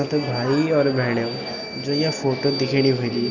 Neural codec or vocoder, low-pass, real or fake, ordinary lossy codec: none; 7.2 kHz; real; none